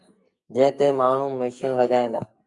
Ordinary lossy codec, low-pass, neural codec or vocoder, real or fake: Opus, 64 kbps; 10.8 kHz; codec, 44.1 kHz, 2.6 kbps, SNAC; fake